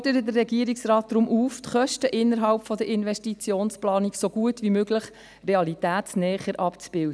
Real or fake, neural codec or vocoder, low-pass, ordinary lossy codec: real; none; none; none